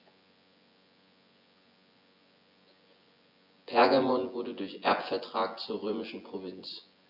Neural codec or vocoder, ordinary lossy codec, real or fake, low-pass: vocoder, 24 kHz, 100 mel bands, Vocos; none; fake; 5.4 kHz